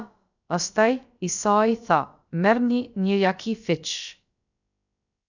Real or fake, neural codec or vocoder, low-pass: fake; codec, 16 kHz, about 1 kbps, DyCAST, with the encoder's durations; 7.2 kHz